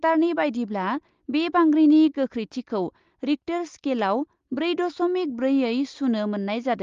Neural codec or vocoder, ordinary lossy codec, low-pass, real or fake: none; Opus, 32 kbps; 7.2 kHz; real